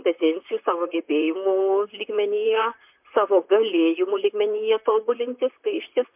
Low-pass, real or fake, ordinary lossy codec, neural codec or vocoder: 3.6 kHz; fake; MP3, 32 kbps; vocoder, 44.1 kHz, 128 mel bands, Pupu-Vocoder